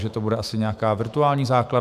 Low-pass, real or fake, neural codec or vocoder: 14.4 kHz; fake; autoencoder, 48 kHz, 128 numbers a frame, DAC-VAE, trained on Japanese speech